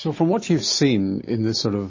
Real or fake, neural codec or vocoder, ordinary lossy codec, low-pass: real; none; MP3, 32 kbps; 7.2 kHz